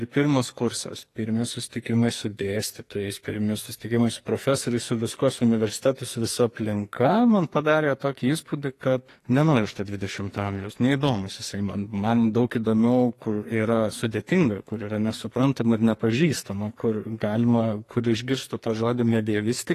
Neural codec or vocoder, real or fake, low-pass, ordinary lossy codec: codec, 44.1 kHz, 2.6 kbps, SNAC; fake; 14.4 kHz; AAC, 48 kbps